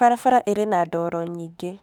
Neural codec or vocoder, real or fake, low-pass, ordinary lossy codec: autoencoder, 48 kHz, 32 numbers a frame, DAC-VAE, trained on Japanese speech; fake; 19.8 kHz; none